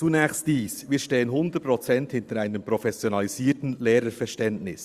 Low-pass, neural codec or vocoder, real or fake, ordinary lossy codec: 14.4 kHz; vocoder, 44.1 kHz, 128 mel bands every 512 samples, BigVGAN v2; fake; Opus, 64 kbps